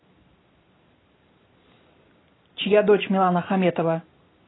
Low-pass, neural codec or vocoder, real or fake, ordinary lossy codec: 7.2 kHz; none; real; AAC, 16 kbps